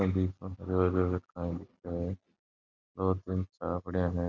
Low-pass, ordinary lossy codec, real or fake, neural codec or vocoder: 7.2 kHz; none; real; none